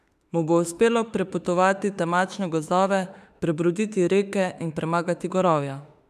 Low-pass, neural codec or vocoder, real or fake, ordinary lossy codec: 14.4 kHz; autoencoder, 48 kHz, 32 numbers a frame, DAC-VAE, trained on Japanese speech; fake; none